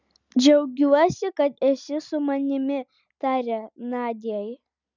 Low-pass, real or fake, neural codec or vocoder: 7.2 kHz; real; none